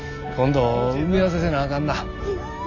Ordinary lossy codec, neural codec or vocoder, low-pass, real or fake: none; none; 7.2 kHz; real